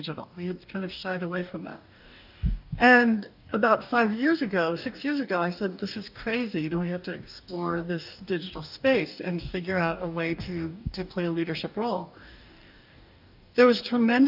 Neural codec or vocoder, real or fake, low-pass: codec, 44.1 kHz, 2.6 kbps, DAC; fake; 5.4 kHz